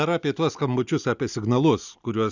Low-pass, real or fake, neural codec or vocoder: 7.2 kHz; real; none